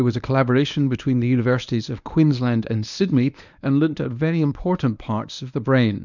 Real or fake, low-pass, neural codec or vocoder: fake; 7.2 kHz; codec, 24 kHz, 0.9 kbps, WavTokenizer, medium speech release version 1